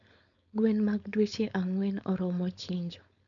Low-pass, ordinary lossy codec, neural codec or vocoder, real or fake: 7.2 kHz; none; codec, 16 kHz, 4.8 kbps, FACodec; fake